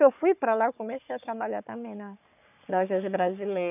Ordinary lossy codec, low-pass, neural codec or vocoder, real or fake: none; 3.6 kHz; codec, 44.1 kHz, 3.4 kbps, Pupu-Codec; fake